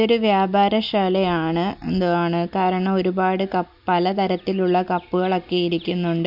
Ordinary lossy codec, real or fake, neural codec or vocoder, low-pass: MP3, 48 kbps; real; none; 5.4 kHz